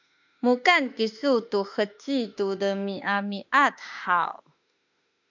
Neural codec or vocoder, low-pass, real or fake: autoencoder, 48 kHz, 32 numbers a frame, DAC-VAE, trained on Japanese speech; 7.2 kHz; fake